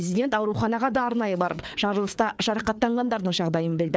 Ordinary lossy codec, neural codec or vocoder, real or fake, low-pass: none; codec, 16 kHz, 4 kbps, FreqCodec, larger model; fake; none